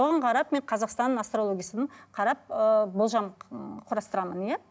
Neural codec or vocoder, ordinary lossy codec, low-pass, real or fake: none; none; none; real